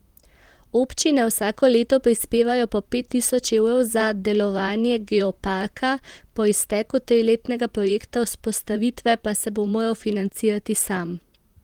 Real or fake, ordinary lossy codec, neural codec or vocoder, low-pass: fake; Opus, 24 kbps; vocoder, 44.1 kHz, 128 mel bands, Pupu-Vocoder; 19.8 kHz